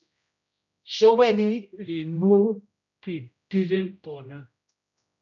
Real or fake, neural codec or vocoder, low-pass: fake; codec, 16 kHz, 0.5 kbps, X-Codec, HuBERT features, trained on general audio; 7.2 kHz